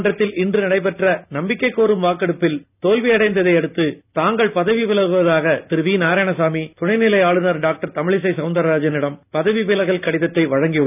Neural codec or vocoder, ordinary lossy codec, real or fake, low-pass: none; none; real; 3.6 kHz